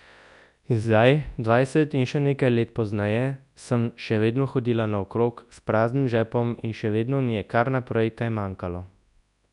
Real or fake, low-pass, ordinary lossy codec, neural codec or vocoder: fake; 10.8 kHz; none; codec, 24 kHz, 0.9 kbps, WavTokenizer, large speech release